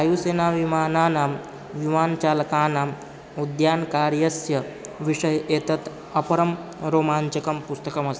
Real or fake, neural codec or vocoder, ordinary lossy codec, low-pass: real; none; none; none